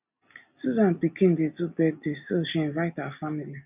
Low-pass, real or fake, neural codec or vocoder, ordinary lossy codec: 3.6 kHz; real; none; none